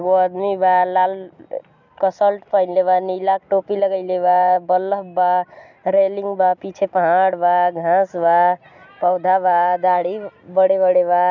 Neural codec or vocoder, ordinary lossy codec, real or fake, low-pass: none; none; real; 7.2 kHz